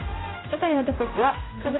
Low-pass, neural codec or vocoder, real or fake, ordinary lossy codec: 7.2 kHz; codec, 16 kHz, 0.5 kbps, X-Codec, HuBERT features, trained on general audio; fake; AAC, 16 kbps